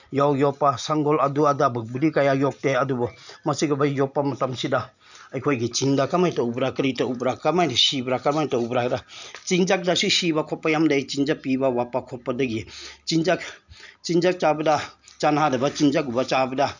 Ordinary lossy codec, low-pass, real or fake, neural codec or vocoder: none; 7.2 kHz; real; none